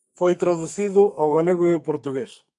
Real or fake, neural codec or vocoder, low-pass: fake; codec, 44.1 kHz, 2.6 kbps, SNAC; 10.8 kHz